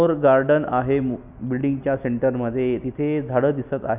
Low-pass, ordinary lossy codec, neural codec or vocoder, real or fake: 3.6 kHz; none; none; real